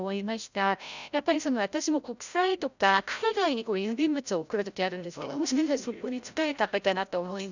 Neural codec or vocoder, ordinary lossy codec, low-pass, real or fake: codec, 16 kHz, 0.5 kbps, FreqCodec, larger model; none; 7.2 kHz; fake